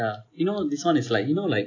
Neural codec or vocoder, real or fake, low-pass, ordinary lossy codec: none; real; 7.2 kHz; AAC, 48 kbps